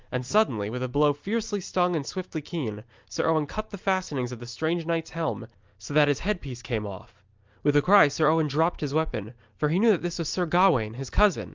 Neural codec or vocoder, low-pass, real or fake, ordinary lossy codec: none; 7.2 kHz; real; Opus, 24 kbps